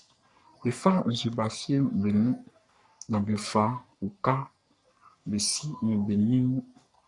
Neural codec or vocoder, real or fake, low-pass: codec, 44.1 kHz, 3.4 kbps, Pupu-Codec; fake; 10.8 kHz